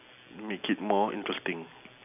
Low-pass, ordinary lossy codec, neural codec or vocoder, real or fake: 3.6 kHz; none; none; real